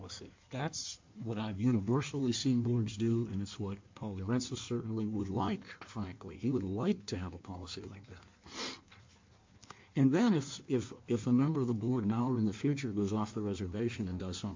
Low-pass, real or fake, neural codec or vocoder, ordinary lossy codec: 7.2 kHz; fake; codec, 16 kHz in and 24 kHz out, 1.1 kbps, FireRedTTS-2 codec; MP3, 48 kbps